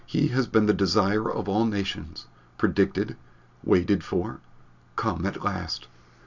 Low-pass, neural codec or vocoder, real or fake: 7.2 kHz; none; real